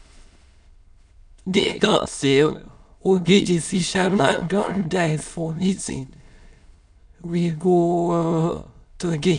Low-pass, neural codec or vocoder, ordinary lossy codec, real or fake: 9.9 kHz; autoencoder, 22.05 kHz, a latent of 192 numbers a frame, VITS, trained on many speakers; none; fake